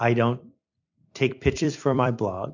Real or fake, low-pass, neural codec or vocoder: fake; 7.2 kHz; vocoder, 44.1 kHz, 128 mel bands every 256 samples, BigVGAN v2